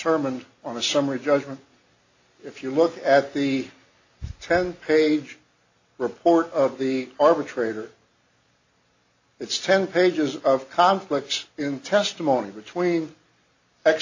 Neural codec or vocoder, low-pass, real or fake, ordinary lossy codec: none; 7.2 kHz; real; AAC, 48 kbps